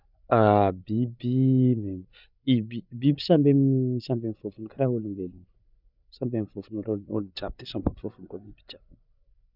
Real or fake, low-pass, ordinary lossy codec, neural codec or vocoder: fake; 5.4 kHz; none; codec, 16 kHz, 8 kbps, FreqCodec, larger model